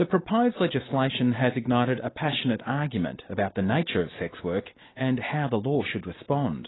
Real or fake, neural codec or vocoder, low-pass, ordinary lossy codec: real; none; 7.2 kHz; AAC, 16 kbps